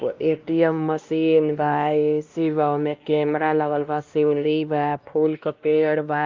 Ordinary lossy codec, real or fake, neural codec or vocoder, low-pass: Opus, 24 kbps; fake; codec, 16 kHz, 1 kbps, X-Codec, WavLM features, trained on Multilingual LibriSpeech; 7.2 kHz